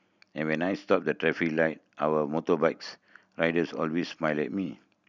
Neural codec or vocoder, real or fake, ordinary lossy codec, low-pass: none; real; none; 7.2 kHz